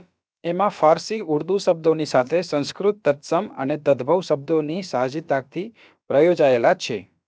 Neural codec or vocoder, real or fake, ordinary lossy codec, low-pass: codec, 16 kHz, about 1 kbps, DyCAST, with the encoder's durations; fake; none; none